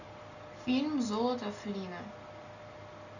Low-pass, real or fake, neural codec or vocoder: 7.2 kHz; real; none